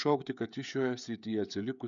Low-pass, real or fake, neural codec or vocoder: 7.2 kHz; fake; codec, 16 kHz, 16 kbps, FreqCodec, smaller model